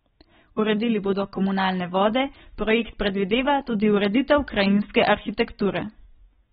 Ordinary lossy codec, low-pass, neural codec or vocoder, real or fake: AAC, 16 kbps; 14.4 kHz; none; real